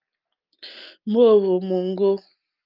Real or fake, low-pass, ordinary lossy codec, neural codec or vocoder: real; 5.4 kHz; Opus, 24 kbps; none